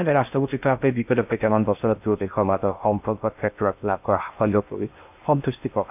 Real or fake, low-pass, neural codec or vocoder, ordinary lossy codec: fake; 3.6 kHz; codec, 16 kHz in and 24 kHz out, 0.6 kbps, FocalCodec, streaming, 2048 codes; none